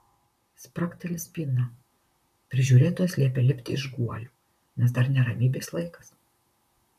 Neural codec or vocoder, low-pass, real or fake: vocoder, 44.1 kHz, 128 mel bands, Pupu-Vocoder; 14.4 kHz; fake